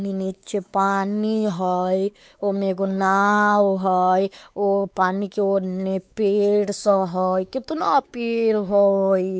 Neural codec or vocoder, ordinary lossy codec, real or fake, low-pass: codec, 16 kHz, 4 kbps, X-Codec, WavLM features, trained on Multilingual LibriSpeech; none; fake; none